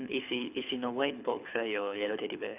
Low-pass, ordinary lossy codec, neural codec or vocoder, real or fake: 3.6 kHz; none; codec, 24 kHz, 6 kbps, HILCodec; fake